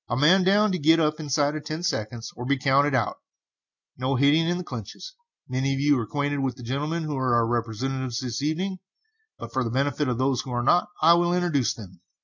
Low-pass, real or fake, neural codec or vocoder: 7.2 kHz; real; none